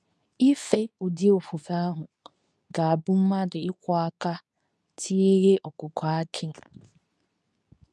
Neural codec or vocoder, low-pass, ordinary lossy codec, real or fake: codec, 24 kHz, 0.9 kbps, WavTokenizer, medium speech release version 2; none; none; fake